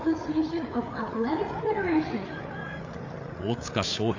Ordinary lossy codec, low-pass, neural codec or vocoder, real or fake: AAC, 48 kbps; 7.2 kHz; codec, 16 kHz, 8 kbps, FreqCodec, larger model; fake